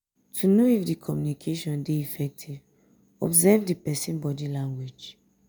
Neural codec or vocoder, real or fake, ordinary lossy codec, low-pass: none; real; none; none